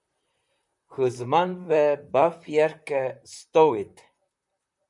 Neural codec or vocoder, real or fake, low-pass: vocoder, 44.1 kHz, 128 mel bands, Pupu-Vocoder; fake; 10.8 kHz